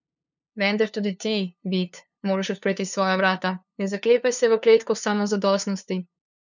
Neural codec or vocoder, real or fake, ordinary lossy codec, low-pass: codec, 16 kHz, 2 kbps, FunCodec, trained on LibriTTS, 25 frames a second; fake; none; 7.2 kHz